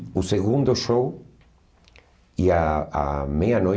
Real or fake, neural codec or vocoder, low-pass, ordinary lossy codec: real; none; none; none